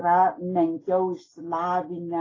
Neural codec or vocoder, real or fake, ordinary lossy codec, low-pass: none; real; AAC, 32 kbps; 7.2 kHz